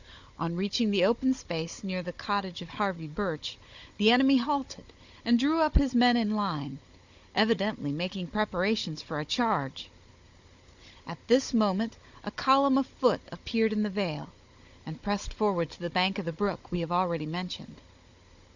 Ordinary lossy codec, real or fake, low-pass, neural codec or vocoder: Opus, 64 kbps; fake; 7.2 kHz; codec, 16 kHz, 16 kbps, FunCodec, trained on Chinese and English, 50 frames a second